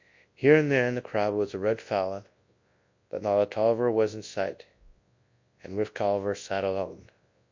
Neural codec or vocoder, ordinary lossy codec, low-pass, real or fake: codec, 24 kHz, 0.9 kbps, WavTokenizer, large speech release; MP3, 48 kbps; 7.2 kHz; fake